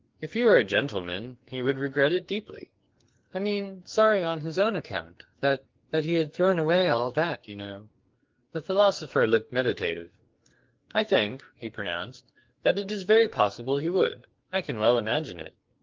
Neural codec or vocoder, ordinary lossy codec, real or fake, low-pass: codec, 44.1 kHz, 2.6 kbps, SNAC; Opus, 32 kbps; fake; 7.2 kHz